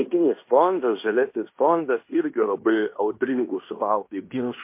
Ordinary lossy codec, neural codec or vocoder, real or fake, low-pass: MP3, 24 kbps; codec, 16 kHz in and 24 kHz out, 0.9 kbps, LongCat-Audio-Codec, fine tuned four codebook decoder; fake; 3.6 kHz